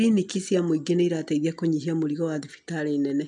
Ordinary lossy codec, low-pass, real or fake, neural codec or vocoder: none; 10.8 kHz; real; none